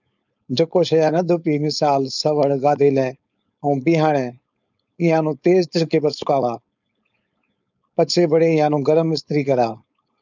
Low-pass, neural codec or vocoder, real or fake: 7.2 kHz; codec, 16 kHz, 4.8 kbps, FACodec; fake